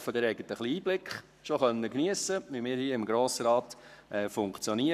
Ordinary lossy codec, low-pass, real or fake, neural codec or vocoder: none; 14.4 kHz; fake; codec, 44.1 kHz, 7.8 kbps, Pupu-Codec